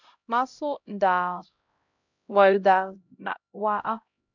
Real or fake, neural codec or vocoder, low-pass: fake; codec, 16 kHz, 0.5 kbps, X-Codec, HuBERT features, trained on LibriSpeech; 7.2 kHz